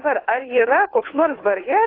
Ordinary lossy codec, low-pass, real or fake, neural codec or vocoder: AAC, 24 kbps; 5.4 kHz; fake; codec, 16 kHz, 2 kbps, FunCodec, trained on Chinese and English, 25 frames a second